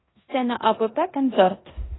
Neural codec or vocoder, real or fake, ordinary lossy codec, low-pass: codec, 16 kHz in and 24 kHz out, 0.9 kbps, LongCat-Audio-Codec, four codebook decoder; fake; AAC, 16 kbps; 7.2 kHz